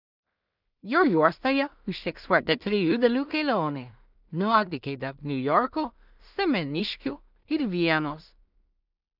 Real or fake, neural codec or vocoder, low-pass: fake; codec, 16 kHz in and 24 kHz out, 0.4 kbps, LongCat-Audio-Codec, two codebook decoder; 5.4 kHz